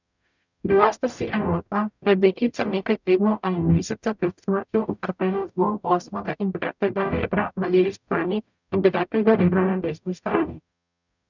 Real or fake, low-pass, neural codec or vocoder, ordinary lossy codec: fake; 7.2 kHz; codec, 44.1 kHz, 0.9 kbps, DAC; none